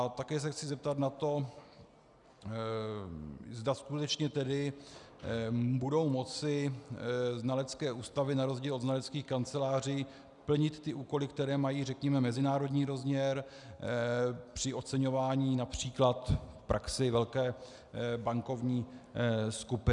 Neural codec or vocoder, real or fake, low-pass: none; real; 10.8 kHz